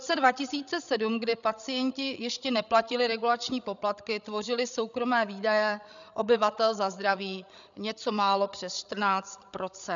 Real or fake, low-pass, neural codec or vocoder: fake; 7.2 kHz; codec, 16 kHz, 16 kbps, FreqCodec, larger model